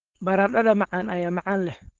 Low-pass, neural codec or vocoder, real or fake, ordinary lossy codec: 7.2 kHz; codec, 16 kHz, 4.8 kbps, FACodec; fake; Opus, 16 kbps